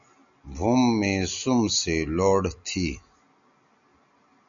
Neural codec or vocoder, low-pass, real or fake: none; 7.2 kHz; real